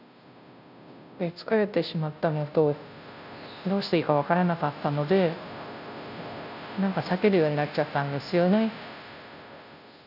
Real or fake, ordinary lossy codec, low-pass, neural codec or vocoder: fake; none; 5.4 kHz; codec, 16 kHz, 0.5 kbps, FunCodec, trained on Chinese and English, 25 frames a second